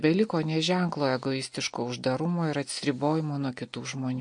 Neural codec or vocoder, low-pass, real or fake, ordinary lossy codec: none; 9.9 kHz; real; MP3, 48 kbps